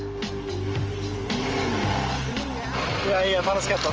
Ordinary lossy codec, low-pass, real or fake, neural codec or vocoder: Opus, 24 kbps; 7.2 kHz; real; none